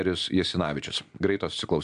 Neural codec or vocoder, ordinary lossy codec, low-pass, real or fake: none; AAC, 96 kbps; 9.9 kHz; real